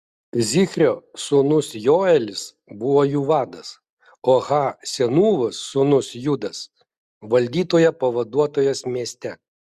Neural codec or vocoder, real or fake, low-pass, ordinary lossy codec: none; real; 14.4 kHz; Opus, 64 kbps